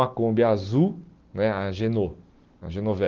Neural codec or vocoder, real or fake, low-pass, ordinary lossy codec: none; real; 7.2 kHz; Opus, 16 kbps